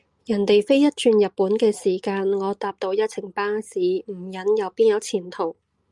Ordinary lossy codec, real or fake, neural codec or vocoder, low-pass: Opus, 32 kbps; real; none; 10.8 kHz